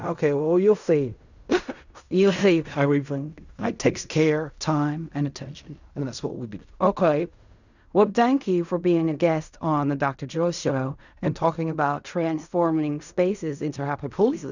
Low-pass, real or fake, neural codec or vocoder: 7.2 kHz; fake; codec, 16 kHz in and 24 kHz out, 0.4 kbps, LongCat-Audio-Codec, fine tuned four codebook decoder